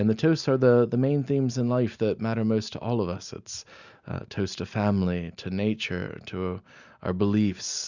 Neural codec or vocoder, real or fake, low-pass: none; real; 7.2 kHz